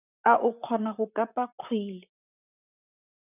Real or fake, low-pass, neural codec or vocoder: fake; 3.6 kHz; vocoder, 44.1 kHz, 128 mel bands, Pupu-Vocoder